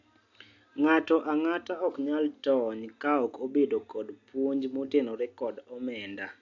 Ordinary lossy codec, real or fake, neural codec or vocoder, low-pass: AAC, 48 kbps; real; none; 7.2 kHz